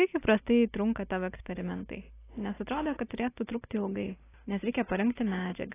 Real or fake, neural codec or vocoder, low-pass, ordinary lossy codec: real; none; 3.6 kHz; AAC, 16 kbps